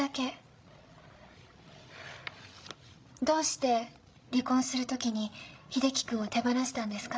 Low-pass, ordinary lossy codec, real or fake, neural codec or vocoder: none; none; fake; codec, 16 kHz, 16 kbps, FreqCodec, larger model